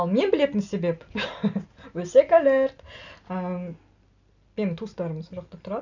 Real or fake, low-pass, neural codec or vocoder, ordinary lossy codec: real; 7.2 kHz; none; none